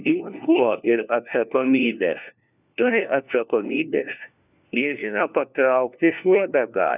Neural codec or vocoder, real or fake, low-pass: codec, 16 kHz, 1 kbps, FunCodec, trained on LibriTTS, 50 frames a second; fake; 3.6 kHz